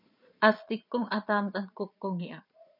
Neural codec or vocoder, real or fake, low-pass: vocoder, 22.05 kHz, 80 mel bands, Vocos; fake; 5.4 kHz